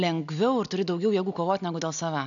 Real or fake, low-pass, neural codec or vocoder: real; 7.2 kHz; none